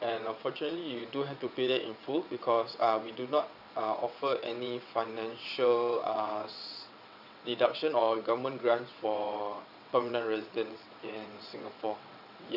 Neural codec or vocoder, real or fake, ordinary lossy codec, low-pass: vocoder, 22.05 kHz, 80 mel bands, WaveNeXt; fake; none; 5.4 kHz